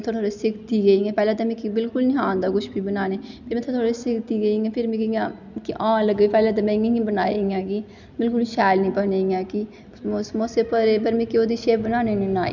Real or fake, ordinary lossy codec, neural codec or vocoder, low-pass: real; none; none; 7.2 kHz